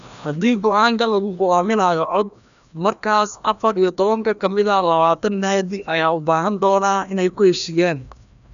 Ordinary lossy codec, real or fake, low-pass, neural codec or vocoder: none; fake; 7.2 kHz; codec, 16 kHz, 1 kbps, FreqCodec, larger model